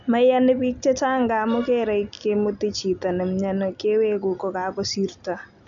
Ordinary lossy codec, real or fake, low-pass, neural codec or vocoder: none; real; 7.2 kHz; none